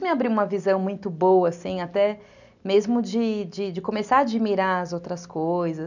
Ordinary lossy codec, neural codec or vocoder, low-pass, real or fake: none; none; 7.2 kHz; real